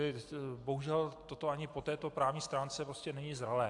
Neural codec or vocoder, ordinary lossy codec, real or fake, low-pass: none; AAC, 64 kbps; real; 10.8 kHz